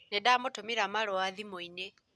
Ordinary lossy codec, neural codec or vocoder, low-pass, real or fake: none; none; none; real